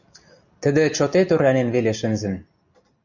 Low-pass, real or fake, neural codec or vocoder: 7.2 kHz; real; none